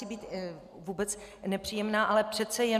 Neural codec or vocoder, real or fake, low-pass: none; real; 14.4 kHz